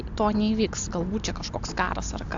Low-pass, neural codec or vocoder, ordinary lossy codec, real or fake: 7.2 kHz; none; AAC, 64 kbps; real